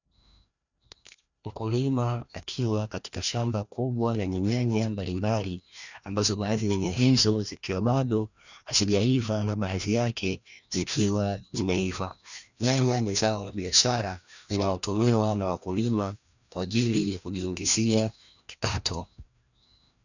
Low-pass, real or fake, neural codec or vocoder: 7.2 kHz; fake; codec, 16 kHz, 1 kbps, FreqCodec, larger model